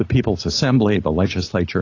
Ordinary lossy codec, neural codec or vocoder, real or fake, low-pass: AAC, 32 kbps; none; real; 7.2 kHz